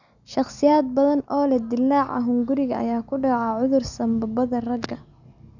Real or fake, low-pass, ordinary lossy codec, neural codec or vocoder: real; 7.2 kHz; none; none